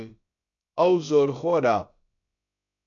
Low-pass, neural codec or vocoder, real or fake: 7.2 kHz; codec, 16 kHz, about 1 kbps, DyCAST, with the encoder's durations; fake